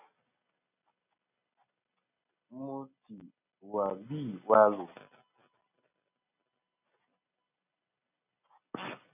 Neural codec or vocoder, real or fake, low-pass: none; real; 3.6 kHz